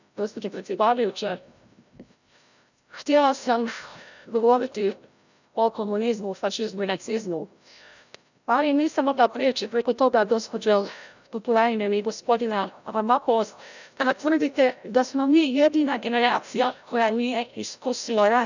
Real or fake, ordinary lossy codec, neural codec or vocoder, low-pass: fake; none; codec, 16 kHz, 0.5 kbps, FreqCodec, larger model; 7.2 kHz